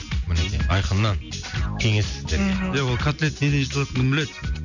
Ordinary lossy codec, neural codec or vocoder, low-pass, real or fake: none; none; 7.2 kHz; real